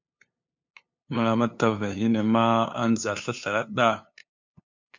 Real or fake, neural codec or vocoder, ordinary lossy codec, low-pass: fake; codec, 16 kHz, 2 kbps, FunCodec, trained on LibriTTS, 25 frames a second; MP3, 48 kbps; 7.2 kHz